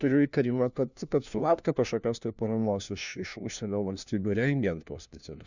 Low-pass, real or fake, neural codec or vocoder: 7.2 kHz; fake; codec, 16 kHz, 1 kbps, FunCodec, trained on LibriTTS, 50 frames a second